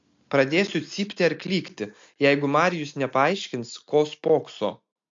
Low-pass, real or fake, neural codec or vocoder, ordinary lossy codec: 7.2 kHz; real; none; MP3, 48 kbps